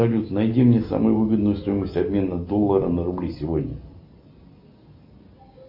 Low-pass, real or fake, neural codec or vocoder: 5.4 kHz; real; none